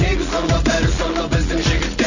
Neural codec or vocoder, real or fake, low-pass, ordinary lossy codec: none; real; 7.2 kHz; none